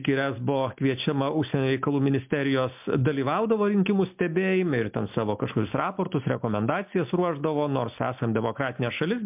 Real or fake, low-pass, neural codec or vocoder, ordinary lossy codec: real; 3.6 kHz; none; MP3, 32 kbps